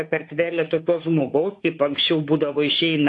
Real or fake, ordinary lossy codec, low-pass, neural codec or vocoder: fake; AAC, 48 kbps; 10.8 kHz; autoencoder, 48 kHz, 32 numbers a frame, DAC-VAE, trained on Japanese speech